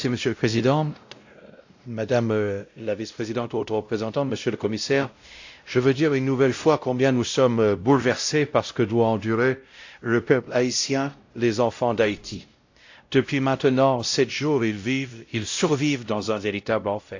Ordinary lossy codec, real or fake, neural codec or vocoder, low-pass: AAC, 48 kbps; fake; codec, 16 kHz, 0.5 kbps, X-Codec, WavLM features, trained on Multilingual LibriSpeech; 7.2 kHz